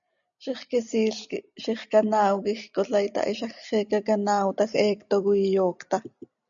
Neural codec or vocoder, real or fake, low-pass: none; real; 7.2 kHz